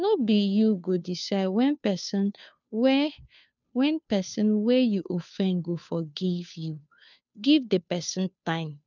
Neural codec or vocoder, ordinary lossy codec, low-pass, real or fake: codec, 16 kHz, 2 kbps, FunCodec, trained on Chinese and English, 25 frames a second; none; 7.2 kHz; fake